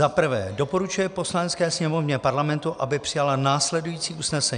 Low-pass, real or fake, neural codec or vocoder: 9.9 kHz; real; none